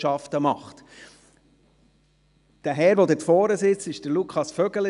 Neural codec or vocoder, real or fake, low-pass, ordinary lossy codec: none; real; 10.8 kHz; none